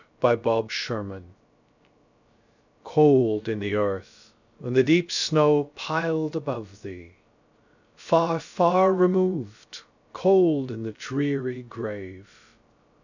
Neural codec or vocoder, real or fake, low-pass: codec, 16 kHz, 0.3 kbps, FocalCodec; fake; 7.2 kHz